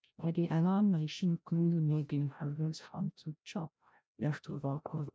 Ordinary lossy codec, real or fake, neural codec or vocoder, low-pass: none; fake; codec, 16 kHz, 0.5 kbps, FreqCodec, larger model; none